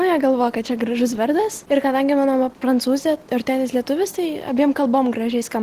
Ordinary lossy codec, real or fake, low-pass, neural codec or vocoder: Opus, 16 kbps; real; 14.4 kHz; none